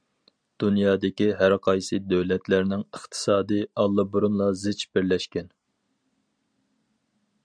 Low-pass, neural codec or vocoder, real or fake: 9.9 kHz; none; real